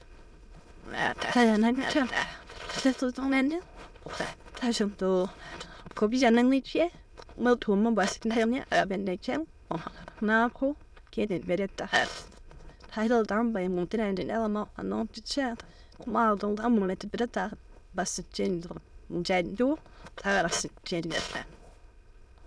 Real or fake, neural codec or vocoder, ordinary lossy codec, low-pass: fake; autoencoder, 22.05 kHz, a latent of 192 numbers a frame, VITS, trained on many speakers; none; none